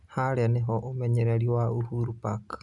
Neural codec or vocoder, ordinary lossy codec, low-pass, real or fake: none; none; 10.8 kHz; real